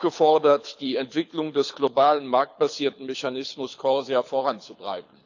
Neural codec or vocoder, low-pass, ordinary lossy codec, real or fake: codec, 24 kHz, 6 kbps, HILCodec; 7.2 kHz; none; fake